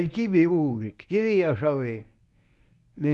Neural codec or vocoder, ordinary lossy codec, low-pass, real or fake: codec, 24 kHz, 0.9 kbps, WavTokenizer, medium speech release version 1; none; none; fake